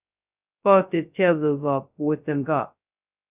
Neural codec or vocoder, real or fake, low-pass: codec, 16 kHz, 0.2 kbps, FocalCodec; fake; 3.6 kHz